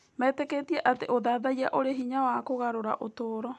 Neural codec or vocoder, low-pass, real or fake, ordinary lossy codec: none; none; real; none